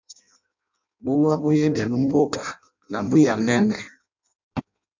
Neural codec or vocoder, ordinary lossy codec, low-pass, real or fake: codec, 16 kHz in and 24 kHz out, 0.6 kbps, FireRedTTS-2 codec; MP3, 64 kbps; 7.2 kHz; fake